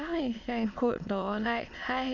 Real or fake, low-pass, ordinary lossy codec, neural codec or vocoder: fake; 7.2 kHz; none; autoencoder, 22.05 kHz, a latent of 192 numbers a frame, VITS, trained on many speakers